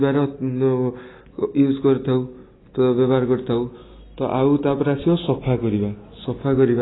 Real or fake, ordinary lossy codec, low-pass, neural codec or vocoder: real; AAC, 16 kbps; 7.2 kHz; none